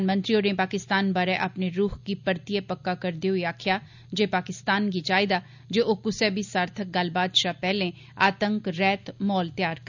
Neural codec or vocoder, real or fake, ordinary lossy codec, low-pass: none; real; none; 7.2 kHz